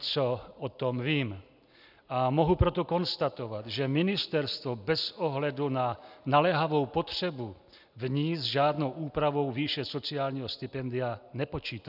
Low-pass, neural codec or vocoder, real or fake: 5.4 kHz; none; real